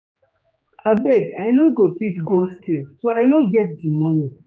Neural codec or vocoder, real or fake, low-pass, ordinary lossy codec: codec, 16 kHz, 2 kbps, X-Codec, HuBERT features, trained on general audio; fake; none; none